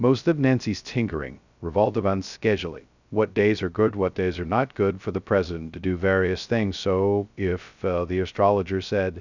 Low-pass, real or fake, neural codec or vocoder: 7.2 kHz; fake; codec, 16 kHz, 0.2 kbps, FocalCodec